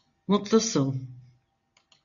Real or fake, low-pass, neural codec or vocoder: real; 7.2 kHz; none